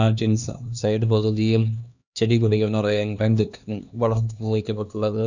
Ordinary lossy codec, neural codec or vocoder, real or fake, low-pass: none; codec, 16 kHz, 2 kbps, X-Codec, HuBERT features, trained on LibriSpeech; fake; 7.2 kHz